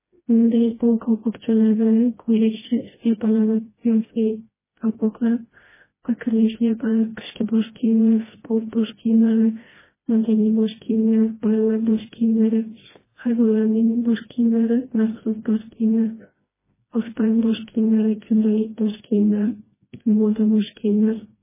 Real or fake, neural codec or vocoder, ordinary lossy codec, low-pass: fake; codec, 16 kHz, 1 kbps, FreqCodec, smaller model; MP3, 16 kbps; 3.6 kHz